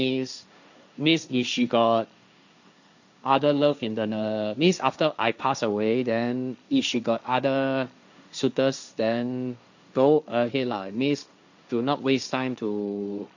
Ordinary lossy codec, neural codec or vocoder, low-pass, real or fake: none; codec, 16 kHz, 1.1 kbps, Voila-Tokenizer; 7.2 kHz; fake